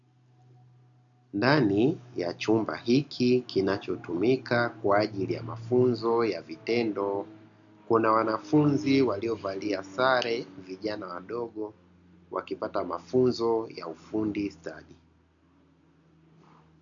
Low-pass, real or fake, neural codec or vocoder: 7.2 kHz; real; none